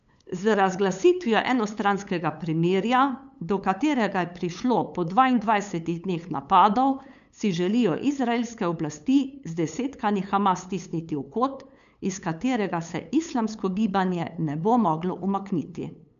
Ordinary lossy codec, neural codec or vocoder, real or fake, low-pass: none; codec, 16 kHz, 8 kbps, FunCodec, trained on LibriTTS, 25 frames a second; fake; 7.2 kHz